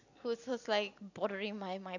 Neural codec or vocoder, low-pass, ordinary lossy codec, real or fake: none; 7.2 kHz; none; real